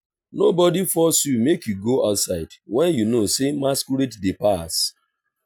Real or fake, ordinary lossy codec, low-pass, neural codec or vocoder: real; none; none; none